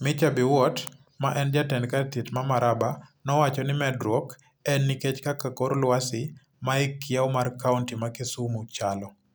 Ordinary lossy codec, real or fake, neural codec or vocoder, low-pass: none; real; none; none